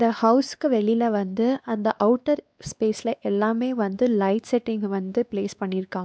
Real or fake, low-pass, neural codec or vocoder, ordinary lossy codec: fake; none; codec, 16 kHz, 2 kbps, X-Codec, WavLM features, trained on Multilingual LibriSpeech; none